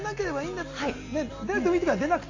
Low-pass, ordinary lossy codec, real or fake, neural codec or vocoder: 7.2 kHz; AAC, 48 kbps; real; none